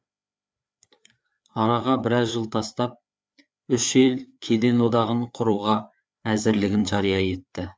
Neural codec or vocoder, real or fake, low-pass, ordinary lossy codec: codec, 16 kHz, 4 kbps, FreqCodec, larger model; fake; none; none